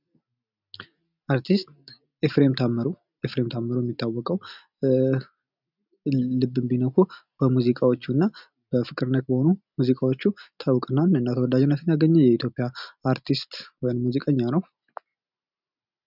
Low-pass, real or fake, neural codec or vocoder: 5.4 kHz; real; none